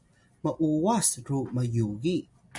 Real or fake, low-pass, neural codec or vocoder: real; 10.8 kHz; none